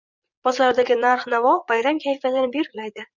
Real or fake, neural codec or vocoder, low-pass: fake; vocoder, 44.1 kHz, 80 mel bands, Vocos; 7.2 kHz